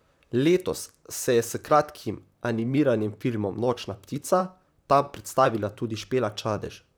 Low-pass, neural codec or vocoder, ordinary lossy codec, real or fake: none; vocoder, 44.1 kHz, 128 mel bands, Pupu-Vocoder; none; fake